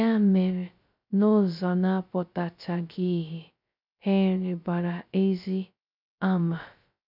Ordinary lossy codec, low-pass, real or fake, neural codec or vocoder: none; 5.4 kHz; fake; codec, 16 kHz, 0.2 kbps, FocalCodec